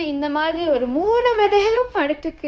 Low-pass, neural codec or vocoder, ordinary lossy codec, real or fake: none; codec, 16 kHz, 0.9 kbps, LongCat-Audio-Codec; none; fake